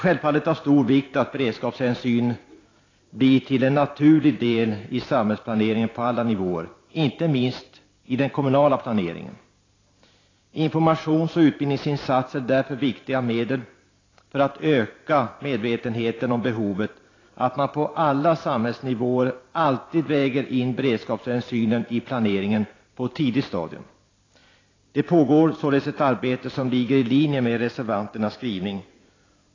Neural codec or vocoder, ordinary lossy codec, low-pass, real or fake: vocoder, 44.1 kHz, 128 mel bands every 512 samples, BigVGAN v2; AAC, 32 kbps; 7.2 kHz; fake